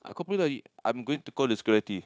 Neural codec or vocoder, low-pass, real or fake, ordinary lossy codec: codec, 16 kHz, 0.9 kbps, LongCat-Audio-Codec; none; fake; none